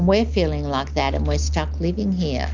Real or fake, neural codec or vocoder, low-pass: real; none; 7.2 kHz